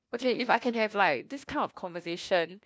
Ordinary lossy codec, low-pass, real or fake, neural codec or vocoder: none; none; fake; codec, 16 kHz, 1 kbps, FunCodec, trained on LibriTTS, 50 frames a second